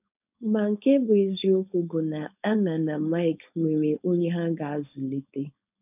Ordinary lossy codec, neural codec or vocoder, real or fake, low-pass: none; codec, 16 kHz, 4.8 kbps, FACodec; fake; 3.6 kHz